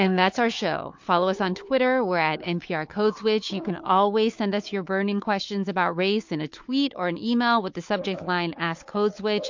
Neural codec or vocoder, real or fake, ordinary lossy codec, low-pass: codec, 16 kHz, 4 kbps, FunCodec, trained on Chinese and English, 50 frames a second; fake; MP3, 48 kbps; 7.2 kHz